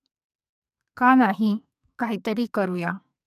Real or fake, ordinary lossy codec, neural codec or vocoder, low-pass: fake; none; codec, 44.1 kHz, 2.6 kbps, SNAC; 14.4 kHz